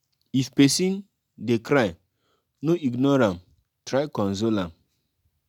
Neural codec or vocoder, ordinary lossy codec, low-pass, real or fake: none; none; 19.8 kHz; real